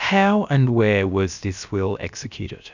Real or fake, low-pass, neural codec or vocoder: fake; 7.2 kHz; codec, 16 kHz, about 1 kbps, DyCAST, with the encoder's durations